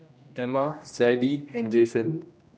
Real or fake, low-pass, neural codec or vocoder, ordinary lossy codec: fake; none; codec, 16 kHz, 1 kbps, X-Codec, HuBERT features, trained on general audio; none